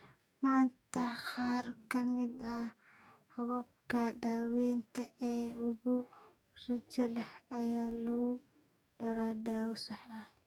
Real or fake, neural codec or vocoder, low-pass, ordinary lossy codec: fake; codec, 44.1 kHz, 2.6 kbps, DAC; 19.8 kHz; none